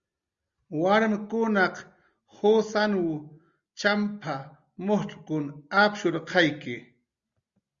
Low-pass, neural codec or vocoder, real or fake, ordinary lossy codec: 7.2 kHz; none; real; Opus, 64 kbps